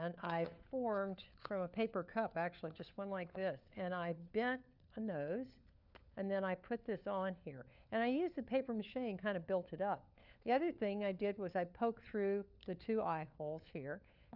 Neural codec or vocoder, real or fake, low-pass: codec, 16 kHz, 4 kbps, FunCodec, trained on LibriTTS, 50 frames a second; fake; 5.4 kHz